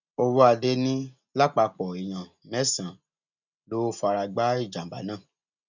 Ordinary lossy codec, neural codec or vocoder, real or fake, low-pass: none; none; real; 7.2 kHz